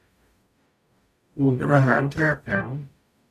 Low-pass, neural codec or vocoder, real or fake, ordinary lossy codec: 14.4 kHz; codec, 44.1 kHz, 0.9 kbps, DAC; fake; none